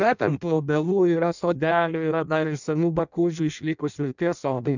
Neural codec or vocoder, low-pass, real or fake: codec, 16 kHz in and 24 kHz out, 0.6 kbps, FireRedTTS-2 codec; 7.2 kHz; fake